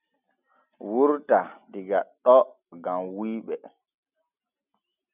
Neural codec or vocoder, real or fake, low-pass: none; real; 3.6 kHz